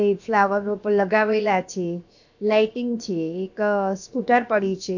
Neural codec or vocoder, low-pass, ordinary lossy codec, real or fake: codec, 16 kHz, about 1 kbps, DyCAST, with the encoder's durations; 7.2 kHz; none; fake